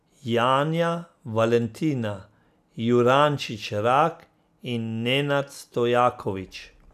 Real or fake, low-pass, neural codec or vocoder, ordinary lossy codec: real; 14.4 kHz; none; none